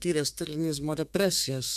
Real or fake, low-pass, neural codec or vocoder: fake; 14.4 kHz; codec, 32 kHz, 1.9 kbps, SNAC